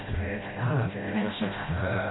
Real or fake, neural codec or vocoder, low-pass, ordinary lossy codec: fake; codec, 16 kHz, 0.5 kbps, FreqCodec, smaller model; 7.2 kHz; AAC, 16 kbps